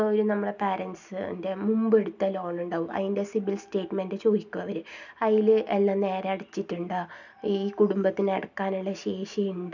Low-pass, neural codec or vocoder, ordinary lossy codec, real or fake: 7.2 kHz; none; none; real